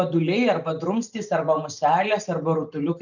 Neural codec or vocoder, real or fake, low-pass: none; real; 7.2 kHz